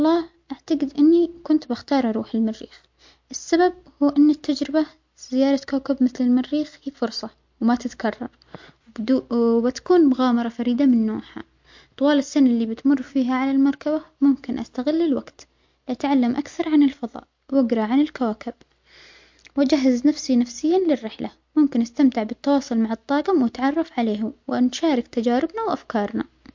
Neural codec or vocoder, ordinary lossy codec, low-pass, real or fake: none; MP3, 48 kbps; 7.2 kHz; real